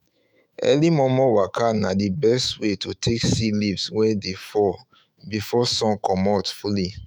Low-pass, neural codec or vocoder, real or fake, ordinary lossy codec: none; autoencoder, 48 kHz, 128 numbers a frame, DAC-VAE, trained on Japanese speech; fake; none